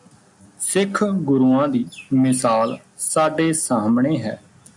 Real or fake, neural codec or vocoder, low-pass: real; none; 10.8 kHz